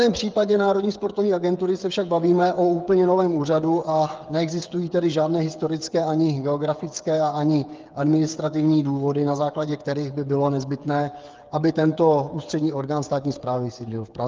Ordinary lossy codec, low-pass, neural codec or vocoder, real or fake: Opus, 24 kbps; 7.2 kHz; codec, 16 kHz, 8 kbps, FreqCodec, smaller model; fake